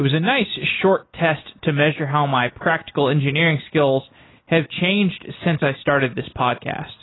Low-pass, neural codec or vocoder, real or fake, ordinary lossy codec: 7.2 kHz; none; real; AAC, 16 kbps